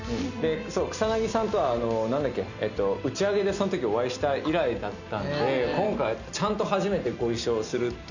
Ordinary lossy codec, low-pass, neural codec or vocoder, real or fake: none; 7.2 kHz; none; real